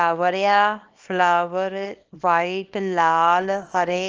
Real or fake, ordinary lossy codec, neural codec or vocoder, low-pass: fake; Opus, 24 kbps; codec, 24 kHz, 0.9 kbps, WavTokenizer, small release; 7.2 kHz